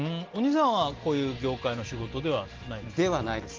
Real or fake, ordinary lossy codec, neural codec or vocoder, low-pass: real; Opus, 16 kbps; none; 7.2 kHz